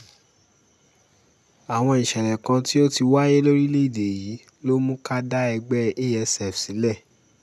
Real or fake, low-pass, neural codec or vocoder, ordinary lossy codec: real; none; none; none